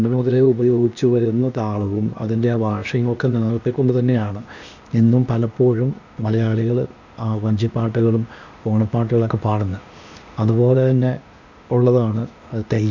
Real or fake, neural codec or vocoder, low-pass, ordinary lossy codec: fake; codec, 16 kHz, 0.8 kbps, ZipCodec; 7.2 kHz; none